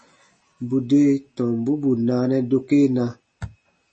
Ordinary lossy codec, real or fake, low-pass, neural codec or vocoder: MP3, 32 kbps; real; 10.8 kHz; none